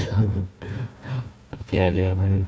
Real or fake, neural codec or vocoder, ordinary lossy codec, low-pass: fake; codec, 16 kHz, 1 kbps, FunCodec, trained on Chinese and English, 50 frames a second; none; none